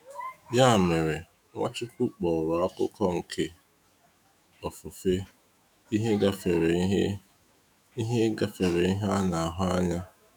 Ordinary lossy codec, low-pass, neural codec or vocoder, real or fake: none; none; autoencoder, 48 kHz, 128 numbers a frame, DAC-VAE, trained on Japanese speech; fake